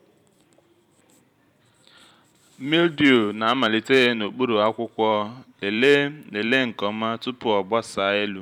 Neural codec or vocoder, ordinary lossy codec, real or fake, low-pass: vocoder, 44.1 kHz, 128 mel bands every 512 samples, BigVGAN v2; none; fake; 19.8 kHz